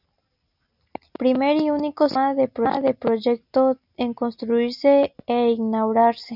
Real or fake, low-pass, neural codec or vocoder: real; 5.4 kHz; none